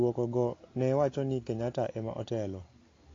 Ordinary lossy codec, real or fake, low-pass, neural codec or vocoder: AAC, 32 kbps; real; 7.2 kHz; none